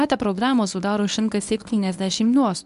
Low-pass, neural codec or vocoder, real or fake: 10.8 kHz; codec, 24 kHz, 0.9 kbps, WavTokenizer, medium speech release version 1; fake